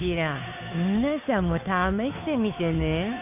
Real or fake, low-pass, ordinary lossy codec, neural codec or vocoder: fake; 3.6 kHz; none; codec, 16 kHz, 2 kbps, FunCodec, trained on Chinese and English, 25 frames a second